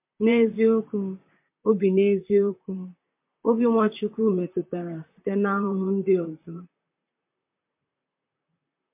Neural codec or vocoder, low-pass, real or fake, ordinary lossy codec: vocoder, 44.1 kHz, 128 mel bands, Pupu-Vocoder; 3.6 kHz; fake; MP3, 32 kbps